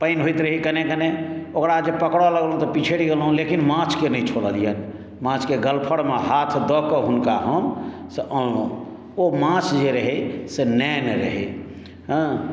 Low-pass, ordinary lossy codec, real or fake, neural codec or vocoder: none; none; real; none